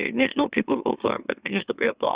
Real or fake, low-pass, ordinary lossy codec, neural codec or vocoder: fake; 3.6 kHz; Opus, 64 kbps; autoencoder, 44.1 kHz, a latent of 192 numbers a frame, MeloTTS